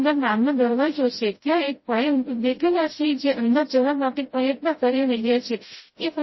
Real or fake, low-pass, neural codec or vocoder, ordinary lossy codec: fake; 7.2 kHz; codec, 16 kHz, 0.5 kbps, FreqCodec, smaller model; MP3, 24 kbps